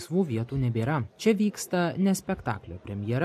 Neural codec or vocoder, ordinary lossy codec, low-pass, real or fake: none; MP3, 64 kbps; 14.4 kHz; real